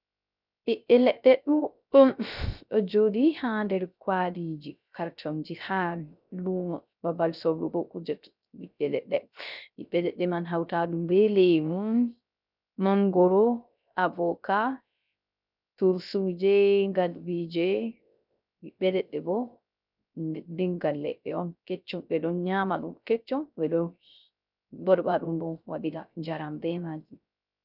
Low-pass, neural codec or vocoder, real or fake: 5.4 kHz; codec, 16 kHz, 0.3 kbps, FocalCodec; fake